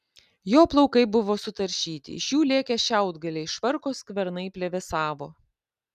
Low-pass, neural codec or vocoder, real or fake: 14.4 kHz; none; real